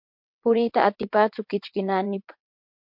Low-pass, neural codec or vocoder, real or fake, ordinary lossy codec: 5.4 kHz; vocoder, 22.05 kHz, 80 mel bands, WaveNeXt; fake; MP3, 48 kbps